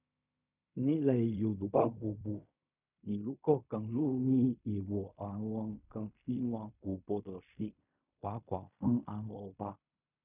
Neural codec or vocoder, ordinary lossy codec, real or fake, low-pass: codec, 16 kHz in and 24 kHz out, 0.4 kbps, LongCat-Audio-Codec, fine tuned four codebook decoder; none; fake; 3.6 kHz